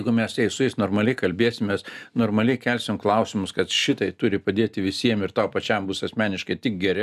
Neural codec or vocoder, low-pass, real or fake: none; 14.4 kHz; real